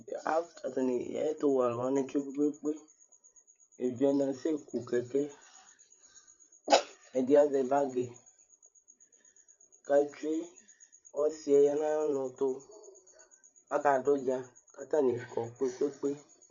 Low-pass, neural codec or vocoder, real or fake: 7.2 kHz; codec, 16 kHz, 8 kbps, FreqCodec, larger model; fake